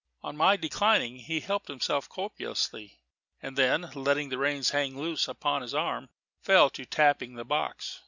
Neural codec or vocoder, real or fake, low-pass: none; real; 7.2 kHz